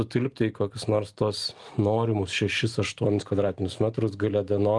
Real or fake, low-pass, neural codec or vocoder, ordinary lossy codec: real; 10.8 kHz; none; Opus, 24 kbps